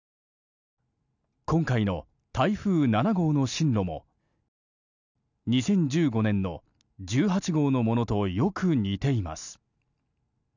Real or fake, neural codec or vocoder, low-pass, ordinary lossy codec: real; none; 7.2 kHz; none